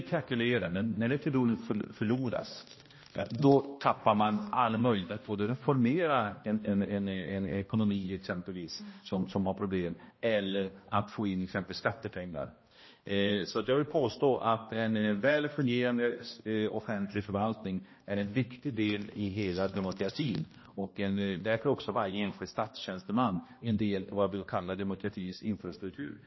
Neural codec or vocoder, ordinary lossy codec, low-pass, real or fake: codec, 16 kHz, 1 kbps, X-Codec, HuBERT features, trained on balanced general audio; MP3, 24 kbps; 7.2 kHz; fake